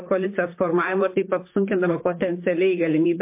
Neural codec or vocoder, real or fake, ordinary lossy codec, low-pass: vocoder, 44.1 kHz, 128 mel bands, Pupu-Vocoder; fake; MP3, 24 kbps; 3.6 kHz